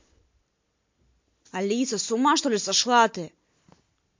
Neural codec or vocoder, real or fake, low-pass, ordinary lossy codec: none; real; 7.2 kHz; MP3, 48 kbps